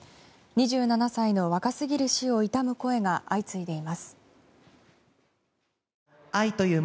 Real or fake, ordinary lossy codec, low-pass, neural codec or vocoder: real; none; none; none